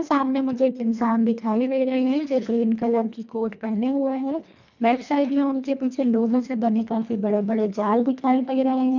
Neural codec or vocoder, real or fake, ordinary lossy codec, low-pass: codec, 24 kHz, 1.5 kbps, HILCodec; fake; none; 7.2 kHz